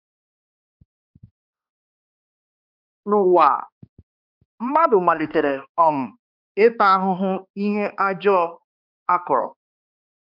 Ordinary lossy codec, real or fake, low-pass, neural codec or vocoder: none; fake; 5.4 kHz; codec, 16 kHz, 2 kbps, X-Codec, HuBERT features, trained on balanced general audio